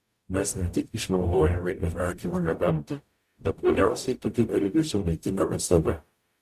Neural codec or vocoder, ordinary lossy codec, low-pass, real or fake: codec, 44.1 kHz, 0.9 kbps, DAC; Opus, 64 kbps; 14.4 kHz; fake